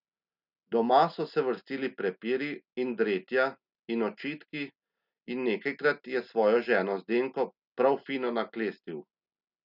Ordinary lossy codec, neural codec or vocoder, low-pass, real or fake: none; none; 5.4 kHz; real